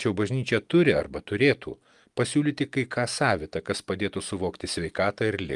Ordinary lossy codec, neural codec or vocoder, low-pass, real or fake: Opus, 32 kbps; none; 10.8 kHz; real